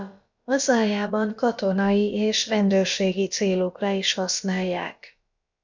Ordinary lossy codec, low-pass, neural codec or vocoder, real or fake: MP3, 64 kbps; 7.2 kHz; codec, 16 kHz, about 1 kbps, DyCAST, with the encoder's durations; fake